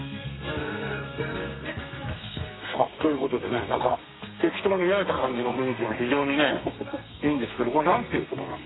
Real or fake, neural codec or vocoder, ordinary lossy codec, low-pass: fake; codec, 32 kHz, 1.9 kbps, SNAC; AAC, 16 kbps; 7.2 kHz